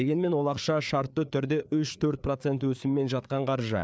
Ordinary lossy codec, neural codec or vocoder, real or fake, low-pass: none; codec, 16 kHz, 4 kbps, FreqCodec, larger model; fake; none